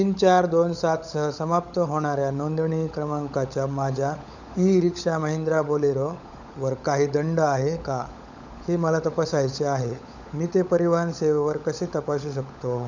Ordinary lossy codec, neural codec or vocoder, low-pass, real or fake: none; codec, 16 kHz, 16 kbps, FunCodec, trained on LibriTTS, 50 frames a second; 7.2 kHz; fake